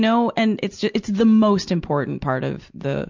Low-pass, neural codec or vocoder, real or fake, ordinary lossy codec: 7.2 kHz; none; real; MP3, 64 kbps